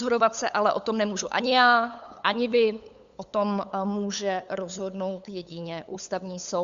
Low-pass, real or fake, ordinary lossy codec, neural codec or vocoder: 7.2 kHz; fake; Opus, 64 kbps; codec, 16 kHz, 8 kbps, FunCodec, trained on LibriTTS, 25 frames a second